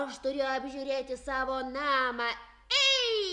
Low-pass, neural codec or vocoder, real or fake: 10.8 kHz; none; real